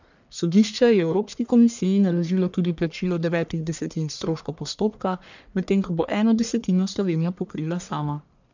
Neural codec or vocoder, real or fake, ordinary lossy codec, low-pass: codec, 44.1 kHz, 1.7 kbps, Pupu-Codec; fake; none; 7.2 kHz